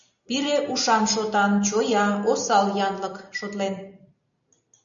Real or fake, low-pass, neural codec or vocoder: real; 7.2 kHz; none